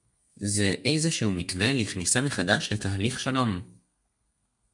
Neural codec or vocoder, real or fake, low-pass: codec, 32 kHz, 1.9 kbps, SNAC; fake; 10.8 kHz